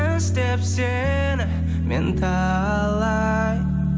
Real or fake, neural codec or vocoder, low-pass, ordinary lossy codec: real; none; none; none